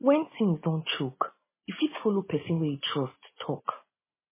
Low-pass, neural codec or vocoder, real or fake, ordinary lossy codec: 3.6 kHz; none; real; MP3, 16 kbps